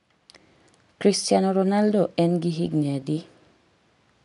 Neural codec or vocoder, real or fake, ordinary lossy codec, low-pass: none; real; none; 10.8 kHz